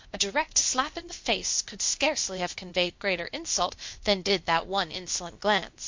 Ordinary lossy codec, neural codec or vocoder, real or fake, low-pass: MP3, 48 kbps; codec, 16 kHz, 0.8 kbps, ZipCodec; fake; 7.2 kHz